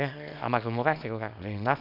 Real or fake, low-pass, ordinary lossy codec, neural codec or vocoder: fake; 5.4 kHz; none; codec, 24 kHz, 0.9 kbps, WavTokenizer, small release